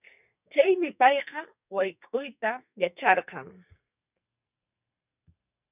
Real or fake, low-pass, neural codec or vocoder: fake; 3.6 kHz; codec, 44.1 kHz, 2.6 kbps, SNAC